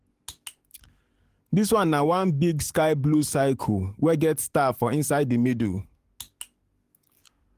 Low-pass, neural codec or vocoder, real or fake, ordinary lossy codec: 14.4 kHz; codec, 44.1 kHz, 7.8 kbps, DAC; fake; Opus, 24 kbps